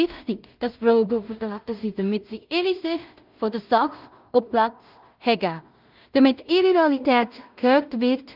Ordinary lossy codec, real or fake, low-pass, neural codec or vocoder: Opus, 24 kbps; fake; 5.4 kHz; codec, 16 kHz in and 24 kHz out, 0.4 kbps, LongCat-Audio-Codec, two codebook decoder